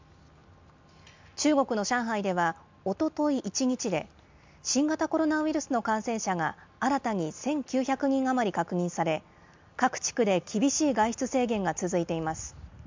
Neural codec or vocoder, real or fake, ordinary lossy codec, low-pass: none; real; MP3, 64 kbps; 7.2 kHz